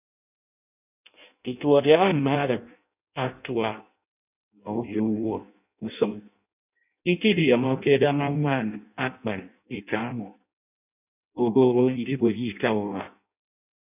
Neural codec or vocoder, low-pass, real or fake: codec, 16 kHz in and 24 kHz out, 0.6 kbps, FireRedTTS-2 codec; 3.6 kHz; fake